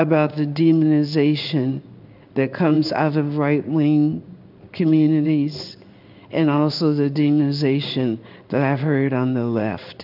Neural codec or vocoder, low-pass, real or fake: codec, 16 kHz in and 24 kHz out, 1 kbps, XY-Tokenizer; 5.4 kHz; fake